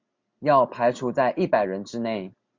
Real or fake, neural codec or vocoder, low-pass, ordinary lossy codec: real; none; 7.2 kHz; MP3, 48 kbps